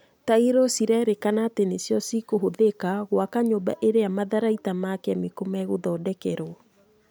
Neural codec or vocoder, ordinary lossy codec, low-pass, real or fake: none; none; none; real